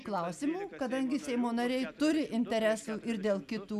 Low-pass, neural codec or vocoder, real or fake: 14.4 kHz; none; real